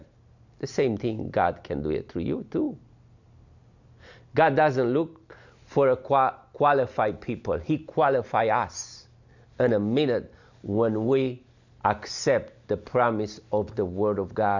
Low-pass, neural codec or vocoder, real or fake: 7.2 kHz; none; real